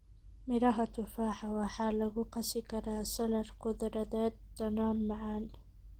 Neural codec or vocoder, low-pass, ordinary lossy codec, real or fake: none; 19.8 kHz; Opus, 16 kbps; real